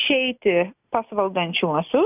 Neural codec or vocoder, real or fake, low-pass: none; real; 3.6 kHz